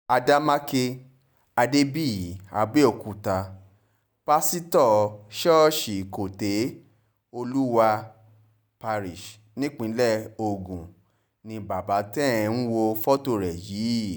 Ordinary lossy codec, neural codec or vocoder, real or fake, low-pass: none; none; real; none